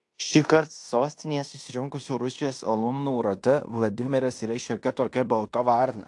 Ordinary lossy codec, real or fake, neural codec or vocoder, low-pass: AAC, 64 kbps; fake; codec, 16 kHz in and 24 kHz out, 0.9 kbps, LongCat-Audio-Codec, fine tuned four codebook decoder; 10.8 kHz